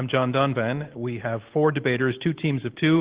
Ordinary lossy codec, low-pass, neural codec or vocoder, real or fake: Opus, 16 kbps; 3.6 kHz; none; real